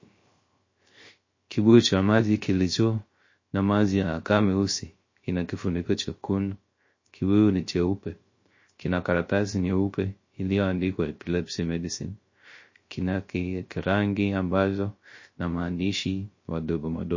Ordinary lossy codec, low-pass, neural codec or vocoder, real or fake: MP3, 32 kbps; 7.2 kHz; codec, 16 kHz, 0.3 kbps, FocalCodec; fake